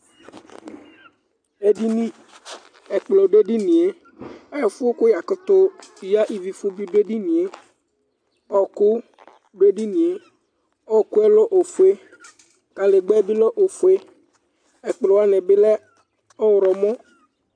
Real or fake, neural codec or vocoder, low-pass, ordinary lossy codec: real; none; 9.9 kHz; AAC, 64 kbps